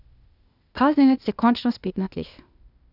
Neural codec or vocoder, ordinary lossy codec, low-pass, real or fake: codec, 16 kHz, 0.8 kbps, ZipCodec; none; 5.4 kHz; fake